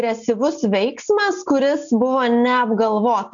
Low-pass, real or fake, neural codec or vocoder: 7.2 kHz; real; none